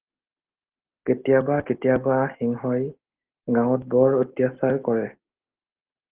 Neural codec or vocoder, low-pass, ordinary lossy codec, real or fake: none; 3.6 kHz; Opus, 16 kbps; real